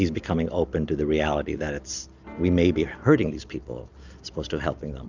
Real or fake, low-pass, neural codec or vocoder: real; 7.2 kHz; none